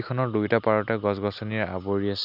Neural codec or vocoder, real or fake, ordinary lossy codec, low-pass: none; real; none; 5.4 kHz